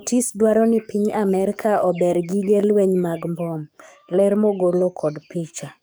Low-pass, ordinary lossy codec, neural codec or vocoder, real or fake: none; none; codec, 44.1 kHz, 7.8 kbps, DAC; fake